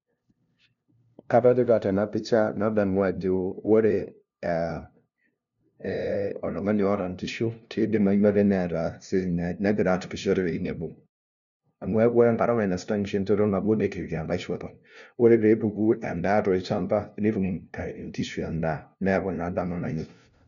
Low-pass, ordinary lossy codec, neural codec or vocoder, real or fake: 7.2 kHz; none; codec, 16 kHz, 0.5 kbps, FunCodec, trained on LibriTTS, 25 frames a second; fake